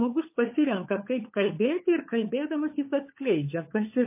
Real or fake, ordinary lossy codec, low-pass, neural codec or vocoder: fake; MP3, 24 kbps; 3.6 kHz; codec, 16 kHz, 4 kbps, FunCodec, trained on LibriTTS, 50 frames a second